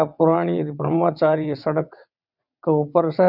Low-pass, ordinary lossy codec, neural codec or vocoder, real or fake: 5.4 kHz; none; vocoder, 22.05 kHz, 80 mel bands, WaveNeXt; fake